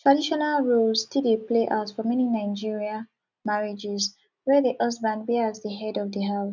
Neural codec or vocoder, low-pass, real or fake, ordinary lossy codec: none; 7.2 kHz; real; none